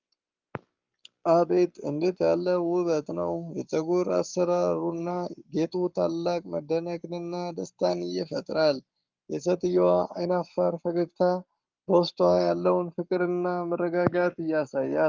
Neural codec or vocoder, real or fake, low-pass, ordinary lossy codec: codec, 44.1 kHz, 7.8 kbps, Pupu-Codec; fake; 7.2 kHz; Opus, 24 kbps